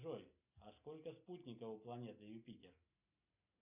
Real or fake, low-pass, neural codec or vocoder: real; 3.6 kHz; none